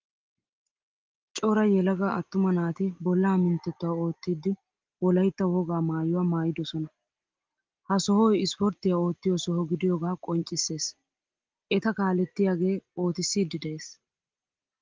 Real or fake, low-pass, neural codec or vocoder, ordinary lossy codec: real; 7.2 kHz; none; Opus, 32 kbps